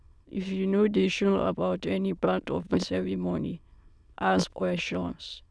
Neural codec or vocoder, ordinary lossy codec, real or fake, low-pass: autoencoder, 22.05 kHz, a latent of 192 numbers a frame, VITS, trained on many speakers; none; fake; none